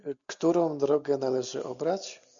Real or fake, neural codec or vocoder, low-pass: real; none; 7.2 kHz